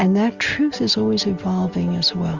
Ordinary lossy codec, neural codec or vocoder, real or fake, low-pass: Opus, 32 kbps; none; real; 7.2 kHz